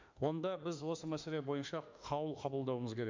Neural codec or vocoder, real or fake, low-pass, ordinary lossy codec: autoencoder, 48 kHz, 32 numbers a frame, DAC-VAE, trained on Japanese speech; fake; 7.2 kHz; MP3, 64 kbps